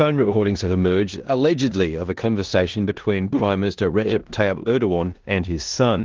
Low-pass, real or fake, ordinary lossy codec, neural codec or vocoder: 7.2 kHz; fake; Opus, 16 kbps; codec, 16 kHz in and 24 kHz out, 0.9 kbps, LongCat-Audio-Codec, four codebook decoder